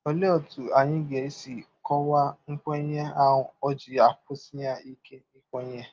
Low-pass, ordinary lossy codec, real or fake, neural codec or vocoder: 7.2 kHz; Opus, 32 kbps; real; none